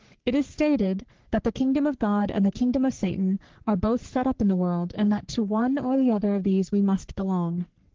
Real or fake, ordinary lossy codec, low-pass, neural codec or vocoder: fake; Opus, 16 kbps; 7.2 kHz; codec, 44.1 kHz, 3.4 kbps, Pupu-Codec